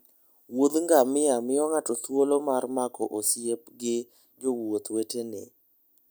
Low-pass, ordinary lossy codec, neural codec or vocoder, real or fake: none; none; none; real